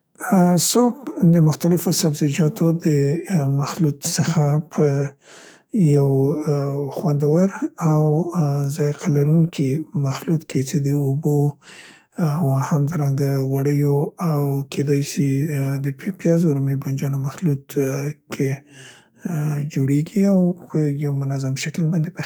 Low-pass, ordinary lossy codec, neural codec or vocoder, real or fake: none; none; codec, 44.1 kHz, 2.6 kbps, SNAC; fake